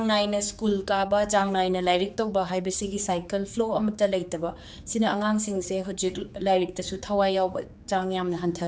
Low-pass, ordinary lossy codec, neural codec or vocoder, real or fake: none; none; codec, 16 kHz, 4 kbps, X-Codec, HuBERT features, trained on general audio; fake